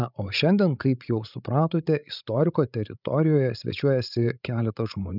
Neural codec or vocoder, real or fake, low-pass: codec, 16 kHz, 16 kbps, FreqCodec, larger model; fake; 5.4 kHz